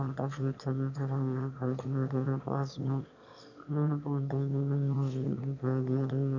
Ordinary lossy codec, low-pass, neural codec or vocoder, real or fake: none; 7.2 kHz; autoencoder, 22.05 kHz, a latent of 192 numbers a frame, VITS, trained on one speaker; fake